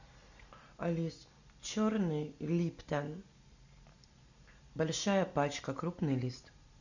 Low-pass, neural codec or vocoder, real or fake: 7.2 kHz; none; real